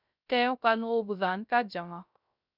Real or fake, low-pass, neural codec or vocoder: fake; 5.4 kHz; codec, 16 kHz, 0.3 kbps, FocalCodec